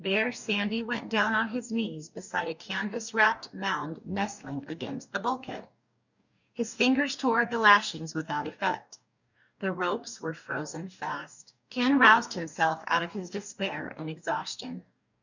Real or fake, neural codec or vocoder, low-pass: fake; codec, 44.1 kHz, 2.6 kbps, DAC; 7.2 kHz